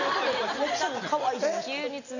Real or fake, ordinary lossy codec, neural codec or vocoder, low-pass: real; none; none; 7.2 kHz